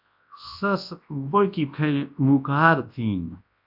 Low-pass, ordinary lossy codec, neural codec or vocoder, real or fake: 5.4 kHz; AAC, 48 kbps; codec, 24 kHz, 0.9 kbps, WavTokenizer, large speech release; fake